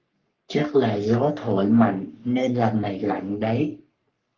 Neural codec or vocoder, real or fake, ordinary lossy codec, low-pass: codec, 44.1 kHz, 3.4 kbps, Pupu-Codec; fake; Opus, 32 kbps; 7.2 kHz